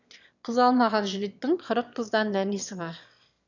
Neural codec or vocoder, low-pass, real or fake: autoencoder, 22.05 kHz, a latent of 192 numbers a frame, VITS, trained on one speaker; 7.2 kHz; fake